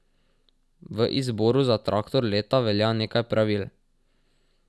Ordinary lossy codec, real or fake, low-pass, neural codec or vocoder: none; real; none; none